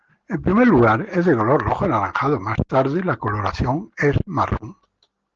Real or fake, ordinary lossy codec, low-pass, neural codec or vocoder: real; Opus, 16 kbps; 7.2 kHz; none